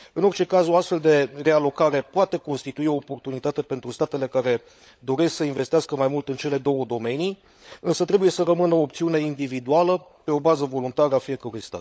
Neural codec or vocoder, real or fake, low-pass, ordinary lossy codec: codec, 16 kHz, 16 kbps, FunCodec, trained on LibriTTS, 50 frames a second; fake; none; none